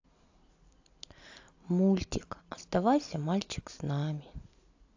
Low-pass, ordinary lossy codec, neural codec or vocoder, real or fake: 7.2 kHz; AAC, 48 kbps; none; real